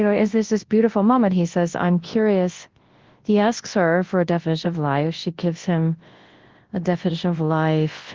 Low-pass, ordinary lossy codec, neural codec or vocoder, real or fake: 7.2 kHz; Opus, 16 kbps; codec, 24 kHz, 0.9 kbps, WavTokenizer, large speech release; fake